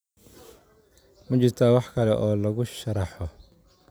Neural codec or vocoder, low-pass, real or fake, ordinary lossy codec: none; none; real; none